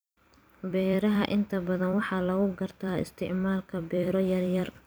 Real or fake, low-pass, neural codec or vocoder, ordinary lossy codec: fake; none; vocoder, 44.1 kHz, 128 mel bands every 256 samples, BigVGAN v2; none